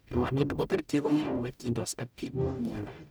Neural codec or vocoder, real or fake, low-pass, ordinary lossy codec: codec, 44.1 kHz, 0.9 kbps, DAC; fake; none; none